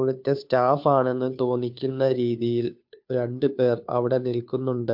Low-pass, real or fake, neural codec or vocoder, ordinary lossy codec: 5.4 kHz; fake; codec, 16 kHz, 2 kbps, FunCodec, trained on Chinese and English, 25 frames a second; MP3, 48 kbps